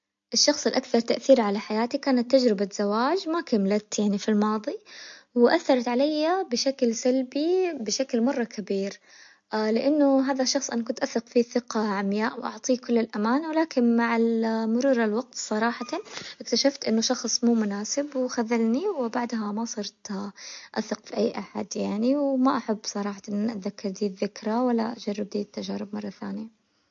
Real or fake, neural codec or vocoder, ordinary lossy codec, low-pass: real; none; MP3, 48 kbps; 7.2 kHz